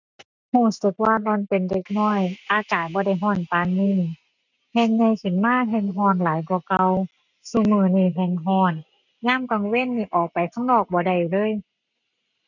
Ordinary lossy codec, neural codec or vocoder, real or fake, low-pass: none; none; real; 7.2 kHz